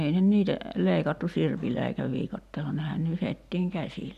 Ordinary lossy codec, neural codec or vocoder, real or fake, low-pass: none; none; real; 14.4 kHz